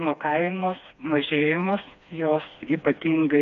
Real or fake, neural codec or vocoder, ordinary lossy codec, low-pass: fake; codec, 16 kHz, 2 kbps, FreqCodec, smaller model; MP3, 64 kbps; 7.2 kHz